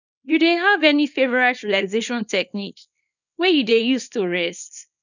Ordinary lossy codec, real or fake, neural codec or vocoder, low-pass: none; fake; codec, 24 kHz, 0.9 kbps, WavTokenizer, small release; 7.2 kHz